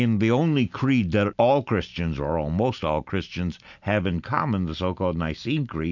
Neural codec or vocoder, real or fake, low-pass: none; real; 7.2 kHz